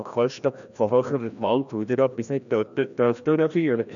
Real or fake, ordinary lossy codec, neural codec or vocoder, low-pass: fake; none; codec, 16 kHz, 1 kbps, FreqCodec, larger model; 7.2 kHz